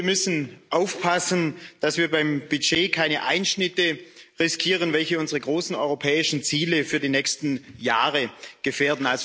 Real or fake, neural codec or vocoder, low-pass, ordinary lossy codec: real; none; none; none